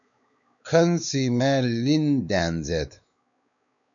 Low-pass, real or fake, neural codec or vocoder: 7.2 kHz; fake; codec, 16 kHz, 4 kbps, X-Codec, WavLM features, trained on Multilingual LibriSpeech